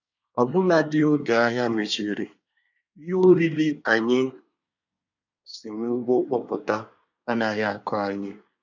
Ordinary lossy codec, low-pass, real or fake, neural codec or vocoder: none; 7.2 kHz; fake; codec, 24 kHz, 1 kbps, SNAC